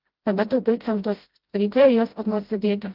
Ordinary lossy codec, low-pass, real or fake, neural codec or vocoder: Opus, 32 kbps; 5.4 kHz; fake; codec, 16 kHz, 0.5 kbps, FreqCodec, smaller model